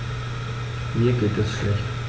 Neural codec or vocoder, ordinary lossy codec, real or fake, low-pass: none; none; real; none